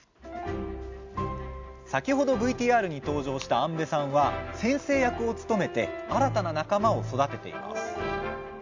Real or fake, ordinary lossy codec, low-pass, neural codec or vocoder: real; MP3, 64 kbps; 7.2 kHz; none